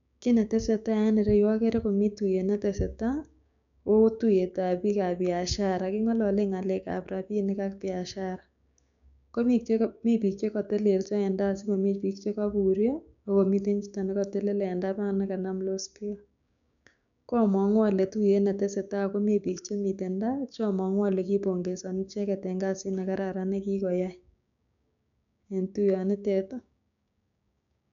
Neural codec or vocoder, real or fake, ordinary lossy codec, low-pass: codec, 16 kHz, 6 kbps, DAC; fake; none; 7.2 kHz